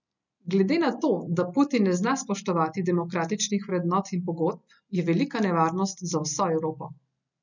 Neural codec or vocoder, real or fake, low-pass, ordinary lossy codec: none; real; 7.2 kHz; none